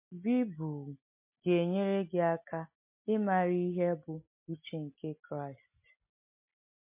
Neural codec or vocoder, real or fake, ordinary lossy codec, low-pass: none; real; MP3, 24 kbps; 3.6 kHz